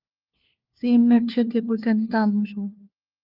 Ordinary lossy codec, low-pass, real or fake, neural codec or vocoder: Opus, 24 kbps; 5.4 kHz; fake; codec, 16 kHz, 1 kbps, FunCodec, trained on LibriTTS, 50 frames a second